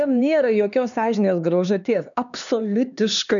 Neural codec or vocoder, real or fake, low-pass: codec, 16 kHz, 2 kbps, X-Codec, HuBERT features, trained on LibriSpeech; fake; 7.2 kHz